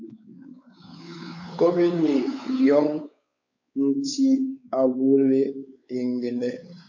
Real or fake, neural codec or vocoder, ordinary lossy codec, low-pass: fake; codec, 16 kHz, 4 kbps, X-Codec, WavLM features, trained on Multilingual LibriSpeech; AAC, 48 kbps; 7.2 kHz